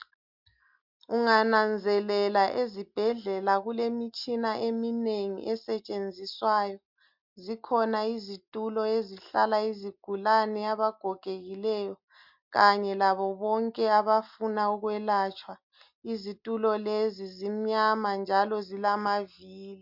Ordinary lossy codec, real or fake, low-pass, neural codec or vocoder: MP3, 48 kbps; real; 5.4 kHz; none